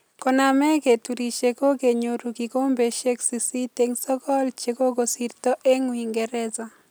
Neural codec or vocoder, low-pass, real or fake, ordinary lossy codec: none; none; real; none